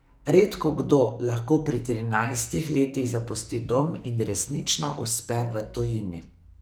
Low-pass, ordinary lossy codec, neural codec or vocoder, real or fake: none; none; codec, 44.1 kHz, 2.6 kbps, SNAC; fake